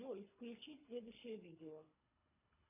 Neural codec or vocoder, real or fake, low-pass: codec, 24 kHz, 3 kbps, HILCodec; fake; 3.6 kHz